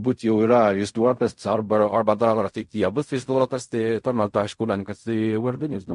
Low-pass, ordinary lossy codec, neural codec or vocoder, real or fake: 10.8 kHz; MP3, 48 kbps; codec, 16 kHz in and 24 kHz out, 0.4 kbps, LongCat-Audio-Codec, fine tuned four codebook decoder; fake